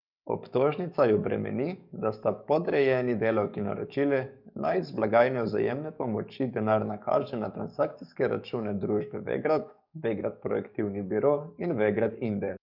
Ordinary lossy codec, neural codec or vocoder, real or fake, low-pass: none; codec, 44.1 kHz, 7.8 kbps, DAC; fake; 5.4 kHz